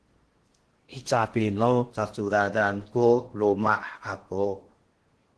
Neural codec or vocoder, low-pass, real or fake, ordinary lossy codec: codec, 16 kHz in and 24 kHz out, 0.6 kbps, FocalCodec, streaming, 4096 codes; 10.8 kHz; fake; Opus, 16 kbps